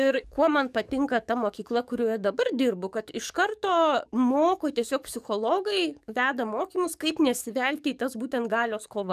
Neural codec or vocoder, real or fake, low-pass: codec, 44.1 kHz, 7.8 kbps, DAC; fake; 14.4 kHz